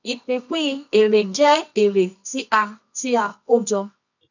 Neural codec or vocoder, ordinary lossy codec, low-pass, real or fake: codec, 24 kHz, 0.9 kbps, WavTokenizer, medium music audio release; none; 7.2 kHz; fake